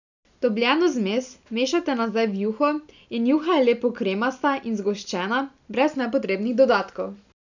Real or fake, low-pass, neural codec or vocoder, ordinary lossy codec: real; 7.2 kHz; none; none